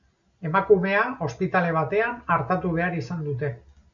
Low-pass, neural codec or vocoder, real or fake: 7.2 kHz; none; real